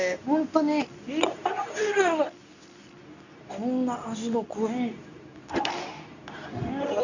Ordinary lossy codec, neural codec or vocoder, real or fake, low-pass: none; codec, 24 kHz, 0.9 kbps, WavTokenizer, medium speech release version 2; fake; 7.2 kHz